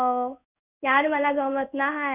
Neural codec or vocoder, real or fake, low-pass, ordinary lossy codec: codec, 16 kHz in and 24 kHz out, 1 kbps, XY-Tokenizer; fake; 3.6 kHz; none